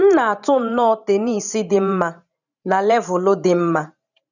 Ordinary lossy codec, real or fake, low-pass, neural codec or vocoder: none; fake; 7.2 kHz; vocoder, 44.1 kHz, 128 mel bands every 512 samples, BigVGAN v2